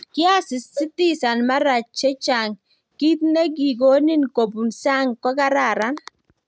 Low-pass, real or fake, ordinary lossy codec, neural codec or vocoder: none; real; none; none